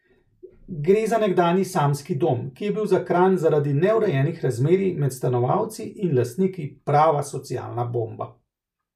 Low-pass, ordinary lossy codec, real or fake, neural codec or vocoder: 14.4 kHz; none; real; none